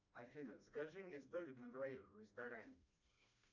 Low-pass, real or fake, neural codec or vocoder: 7.2 kHz; fake; codec, 16 kHz, 1 kbps, FreqCodec, smaller model